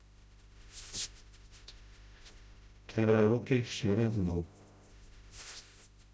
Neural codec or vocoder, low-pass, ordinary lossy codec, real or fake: codec, 16 kHz, 0.5 kbps, FreqCodec, smaller model; none; none; fake